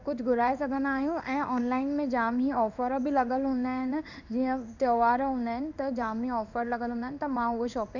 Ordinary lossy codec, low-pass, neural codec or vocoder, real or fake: none; 7.2 kHz; codec, 16 kHz in and 24 kHz out, 1 kbps, XY-Tokenizer; fake